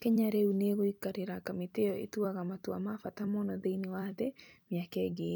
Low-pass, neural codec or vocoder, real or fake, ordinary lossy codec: none; vocoder, 44.1 kHz, 128 mel bands every 256 samples, BigVGAN v2; fake; none